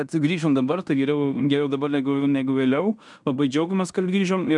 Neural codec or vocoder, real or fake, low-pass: codec, 16 kHz in and 24 kHz out, 0.9 kbps, LongCat-Audio-Codec, fine tuned four codebook decoder; fake; 10.8 kHz